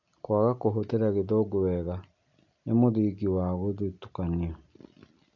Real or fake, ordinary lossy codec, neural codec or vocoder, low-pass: real; none; none; 7.2 kHz